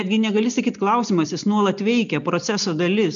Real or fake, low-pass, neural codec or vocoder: real; 7.2 kHz; none